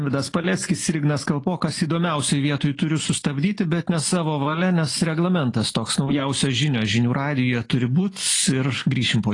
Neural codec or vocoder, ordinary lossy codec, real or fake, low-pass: none; AAC, 32 kbps; real; 10.8 kHz